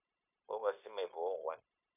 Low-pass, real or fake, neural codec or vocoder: 3.6 kHz; fake; codec, 16 kHz, 0.9 kbps, LongCat-Audio-Codec